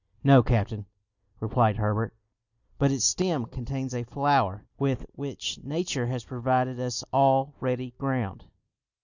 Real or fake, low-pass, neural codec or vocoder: real; 7.2 kHz; none